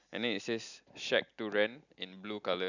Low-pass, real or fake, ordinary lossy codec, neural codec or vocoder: 7.2 kHz; real; none; none